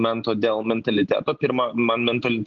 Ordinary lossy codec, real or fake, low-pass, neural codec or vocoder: Opus, 24 kbps; real; 7.2 kHz; none